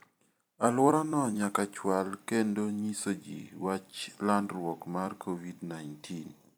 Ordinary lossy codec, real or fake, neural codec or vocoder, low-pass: none; fake; vocoder, 44.1 kHz, 128 mel bands every 256 samples, BigVGAN v2; none